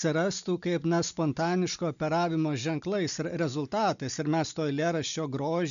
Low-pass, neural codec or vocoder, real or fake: 7.2 kHz; none; real